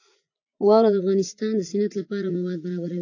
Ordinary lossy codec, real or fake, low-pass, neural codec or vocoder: AAC, 48 kbps; fake; 7.2 kHz; vocoder, 44.1 kHz, 80 mel bands, Vocos